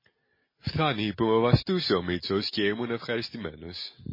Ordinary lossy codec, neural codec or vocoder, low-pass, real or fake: MP3, 24 kbps; none; 5.4 kHz; real